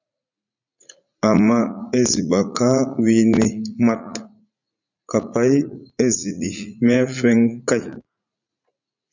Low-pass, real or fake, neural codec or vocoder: 7.2 kHz; fake; vocoder, 44.1 kHz, 80 mel bands, Vocos